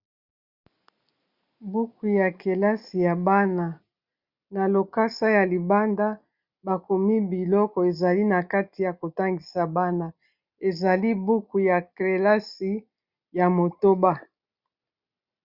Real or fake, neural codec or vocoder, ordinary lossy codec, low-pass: real; none; Opus, 64 kbps; 5.4 kHz